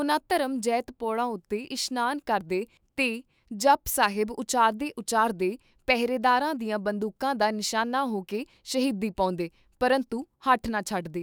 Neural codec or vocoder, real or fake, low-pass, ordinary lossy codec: autoencoder, 48 kHz, 128 numbers a frame, DAC-VAE, trained on Japanese speech; fake; none; none